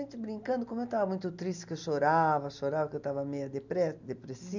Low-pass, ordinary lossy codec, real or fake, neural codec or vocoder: 7.2 kHz; none; real; none